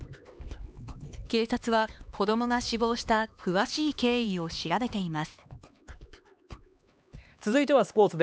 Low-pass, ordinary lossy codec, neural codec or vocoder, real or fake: none; none; codec, 16 kHz, 2 kbps, X-Codec, HuBERT features, trained on LibriSpeech; fake